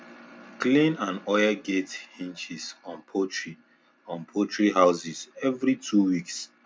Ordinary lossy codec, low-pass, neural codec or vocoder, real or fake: none; none; none; real